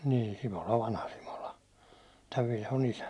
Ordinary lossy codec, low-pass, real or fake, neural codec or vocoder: none; none; real; none